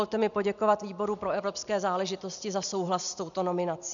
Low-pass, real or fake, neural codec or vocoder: 7.2 kHz; real; none